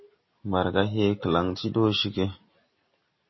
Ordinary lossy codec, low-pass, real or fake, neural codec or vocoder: MP3, 24 kbps; 7.2 kHz; real; none